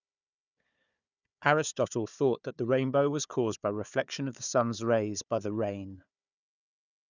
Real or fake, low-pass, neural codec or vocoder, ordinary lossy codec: fake; 7.2 kHz; codec, 16 kHz, 4 kbps, FunCodec, trained on Chinese and English, 50 frames a second; none